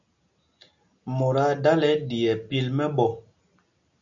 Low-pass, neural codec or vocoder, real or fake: 7.2 kHz; none; real